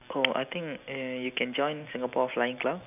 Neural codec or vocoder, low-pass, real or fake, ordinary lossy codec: none; 3.6 kHz; real; none